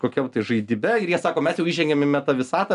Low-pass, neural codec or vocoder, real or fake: 10.8 kHz; none; real